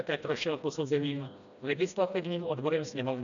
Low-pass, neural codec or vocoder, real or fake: 7.2 kHz; codec, 16 kHz, 1 kbps, FreqCodec, smaller model; fake